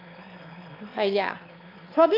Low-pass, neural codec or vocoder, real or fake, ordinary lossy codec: 5.4 kHz; autoencoder, 22.05 kHz, a latent of 192 numbers a frame, VITS, trained on one speaker; fake; AAC, 32 kbps